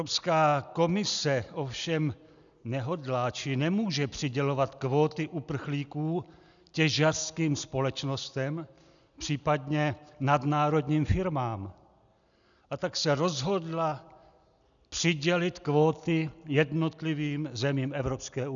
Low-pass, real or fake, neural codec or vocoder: 7.2 kHz; real; none